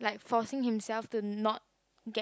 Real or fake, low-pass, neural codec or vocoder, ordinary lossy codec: real; none; none; none